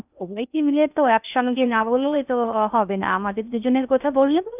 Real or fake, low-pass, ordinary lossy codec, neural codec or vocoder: fake; 3.6 kHz; none; codec, 16 kHz in and 24 kHz out, 0.6 kbps, FocalCodec, streaming, 4096 codes